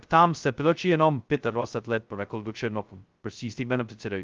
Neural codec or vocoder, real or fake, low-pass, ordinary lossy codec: codec, 16 kHz, 0.2 kbps, FocalCodec; fake; 7.2 kHz; Opus, 32 kbps